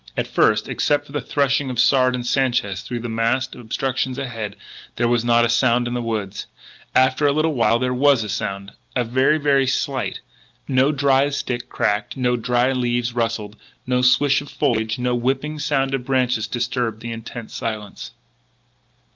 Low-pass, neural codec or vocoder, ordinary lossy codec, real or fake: 7.2 kHz; none; Opus, 32 kbps; real